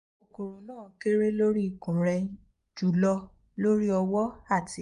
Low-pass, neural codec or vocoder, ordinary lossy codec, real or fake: 10.8 kHz; vocoder, 24 kHz, 100 mel bands, Vocos; none; fake